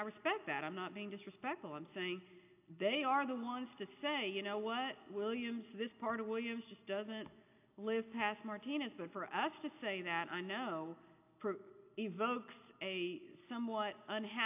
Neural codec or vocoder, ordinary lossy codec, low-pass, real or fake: none; AAC, 32 kbps; 3.6 kHz; real